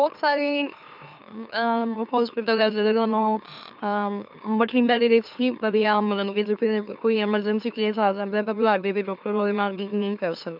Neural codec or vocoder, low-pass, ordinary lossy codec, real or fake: autoencoder, 44.1 kHz, a latent of 192 numbers a frame, MeloTTS; 5.4 kHz; none; fake